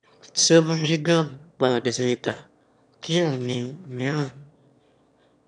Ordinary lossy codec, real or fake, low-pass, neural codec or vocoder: MP3, 96 kbps; fake; 9.9 kHz; autoencoder, 22.05 kHz, a latent of 192 numbers a frame, VITS, trained on one speaker